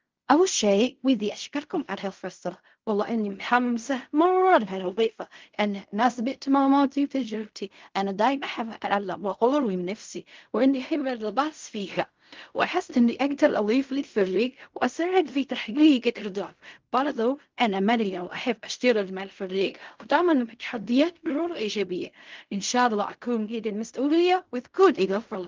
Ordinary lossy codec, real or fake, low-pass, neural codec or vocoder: Opus, 32 kbps; fake; 7.2 kHz; codec, 16 kHz in and 24 kHz out, 0.4 kbps, LongCat-Audio-Codec, fine tuned four codebook decoder